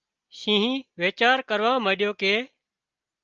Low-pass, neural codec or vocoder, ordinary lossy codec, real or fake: 7.2 kHz; none; Opus, 24 kbps; real